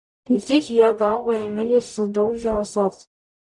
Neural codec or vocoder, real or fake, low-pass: codec, 44.1 kHz, 0.9 kbps, DAC; fake; 10.8 kHz